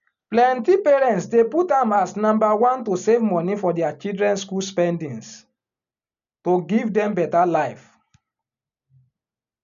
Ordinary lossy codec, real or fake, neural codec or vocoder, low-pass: none; real; none; 7.2 kHz